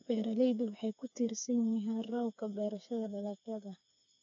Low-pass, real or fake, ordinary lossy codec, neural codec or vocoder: 7.2 kHz; fake; AAC, 64 kbps; codec, 16 kHz, 4 kbps, FreqCodec, smaller model